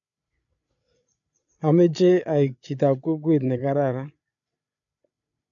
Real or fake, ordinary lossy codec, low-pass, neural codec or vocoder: fake; AAC, 64 kbps; 7.2 kHz; codec, 16 kHz, 8 kbps, FreqCodec, larger model